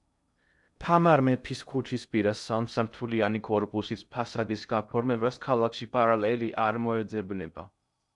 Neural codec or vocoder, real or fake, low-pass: codec, 16 kHz in and 24 kHz out, 0.6 kbps, FocalCodec, streaming, 2048 codes; fake; 10.8 kHz